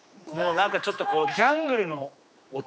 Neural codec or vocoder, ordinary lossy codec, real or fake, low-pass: codec, 16 kHz, 2 kbps, X-Codec, HuBERT features, trained on general audio; none; fake; none